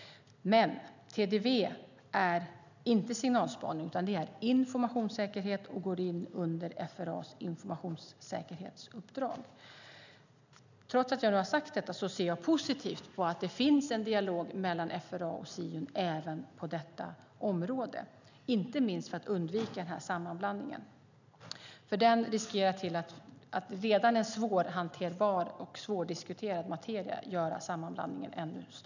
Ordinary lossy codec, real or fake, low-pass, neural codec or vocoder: none; real; 7.2 kHz; none